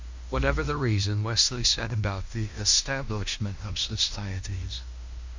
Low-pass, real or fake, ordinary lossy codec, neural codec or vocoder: 7.2 kHz; fake; MP3, 64 kbps; codec, 16 kHz in and 24 kHz out, 0.9 kbps, LongCat-Audio-Codec, fine tuned four codebook decoder